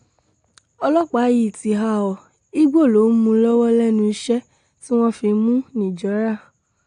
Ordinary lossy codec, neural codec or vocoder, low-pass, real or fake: AAC, 48 kbps; none; 9.9 kHz; real